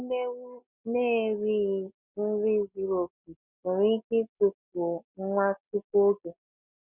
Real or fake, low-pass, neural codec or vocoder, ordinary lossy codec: real; 3.6 kHz; none; none